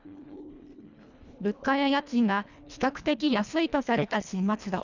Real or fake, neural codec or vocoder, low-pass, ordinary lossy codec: fake; codec, 24 kHz, 1.5 kbps, HILCodec; 7.2 kHz; none